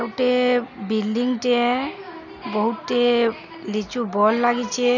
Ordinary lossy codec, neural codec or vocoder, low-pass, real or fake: none; none; 7.2 kHz; real